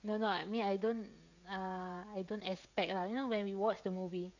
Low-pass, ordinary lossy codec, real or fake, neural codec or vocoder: 7.2 kHz; none; fake; codec, 16 kHz, 16 kbps, FreqCodec, smaller model